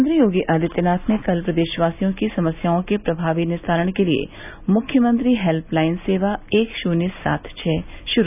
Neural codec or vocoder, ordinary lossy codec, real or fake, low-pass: none; none; real; 3.6 kHz